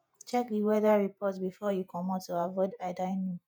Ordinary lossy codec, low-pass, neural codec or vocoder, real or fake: none; none; vocoder, 48 kHz, 128 mel bands, Vocos; fake